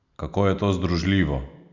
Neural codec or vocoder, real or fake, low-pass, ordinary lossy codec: none; real; 7.2 kHz; none